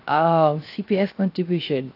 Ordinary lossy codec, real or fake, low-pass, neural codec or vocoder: AAC, 32 kbps; fake; 5.4 kHz; codec, 16 kHz, 0.7 kbps, FocalCodec